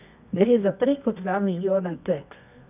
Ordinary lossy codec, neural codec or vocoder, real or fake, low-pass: none; codec, 24 kHz, 0.9 kbps, WavTokenizer, medium music audio release; fake; 3.6 kHz